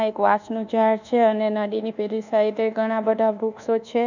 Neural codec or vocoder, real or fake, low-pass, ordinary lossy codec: codec, 24 kHz, 1.2 kbps, DualCodec; fake; 7.2 kHz; none